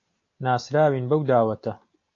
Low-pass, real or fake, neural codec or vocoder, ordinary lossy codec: 7.2 kHz; real; none; AAC, 48 kbps